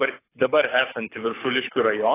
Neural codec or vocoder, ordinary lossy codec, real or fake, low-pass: codec, 24 kHz, 6 kbps, HILCodec; AAC, 16 kbps; fake; 3.6 kHz